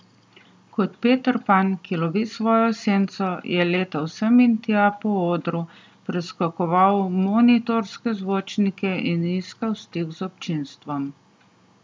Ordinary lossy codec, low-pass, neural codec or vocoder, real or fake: none; none; none; real